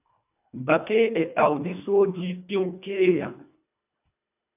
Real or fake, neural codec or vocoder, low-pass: fake; codec, 24 kHz, 1.5 kbps, HILCodec; 3.6 kHz